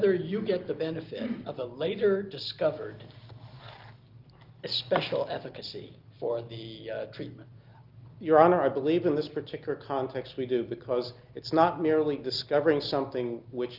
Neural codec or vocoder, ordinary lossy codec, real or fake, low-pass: none; Opus, 32 kbps; real; 5.4 kHz